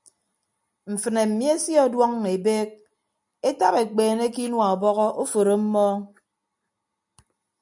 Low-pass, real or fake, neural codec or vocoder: 10.8 kHz; real; none